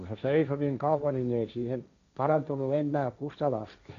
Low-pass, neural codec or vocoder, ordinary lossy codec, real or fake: 7.2 kHz; codec, 16 kHz, 1.1 kbps, Voila-Tokenizer; none; fake